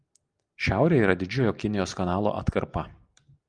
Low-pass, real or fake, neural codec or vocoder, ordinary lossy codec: 9.9 kHz; real; none; Opus, 32 kbps